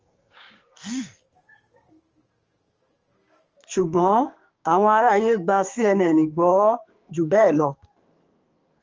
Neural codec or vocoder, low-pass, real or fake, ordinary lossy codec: codec, 44.1 kHz, 2.6 kbps, SNAC; 7.2 kHz; fake; Opus, 24 kbps